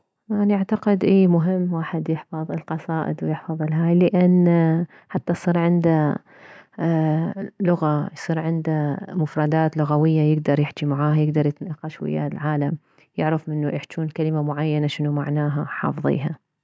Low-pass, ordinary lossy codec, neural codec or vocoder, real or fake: none; none; none; real